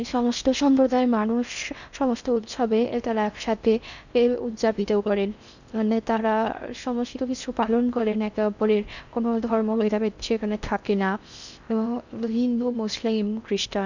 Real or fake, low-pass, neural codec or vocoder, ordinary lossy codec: fake; 7.2 kHz; codec, 16 kHz in and 24 kHz out, 0.6 kbps, FocalCodec, streaming, 4096 codes; none